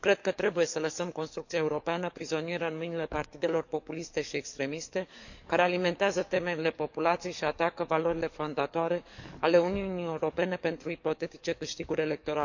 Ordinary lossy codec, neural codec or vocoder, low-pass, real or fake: none; codec, 44.1 kHz, 7.8 kbps, DAC; 7.2 kHz; fake